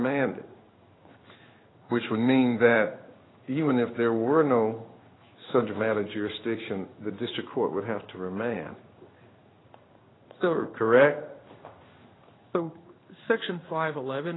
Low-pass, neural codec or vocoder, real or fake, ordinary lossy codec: 7.2 kHz; codec, 16 kHz in and 24 kHz out, 1 kbps, XY-Tokenizer; fake; AAC, 16 kbps